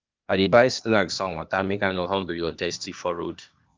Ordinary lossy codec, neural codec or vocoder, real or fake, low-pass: Opus, 24 kbps; codec, 16 kHz, 0.8 kbps, ZipCodec; fake; 7.2 kHz